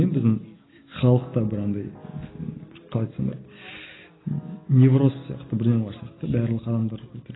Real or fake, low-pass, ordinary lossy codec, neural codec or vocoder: real; 7.2 kHz; AAC, 16 kbps; none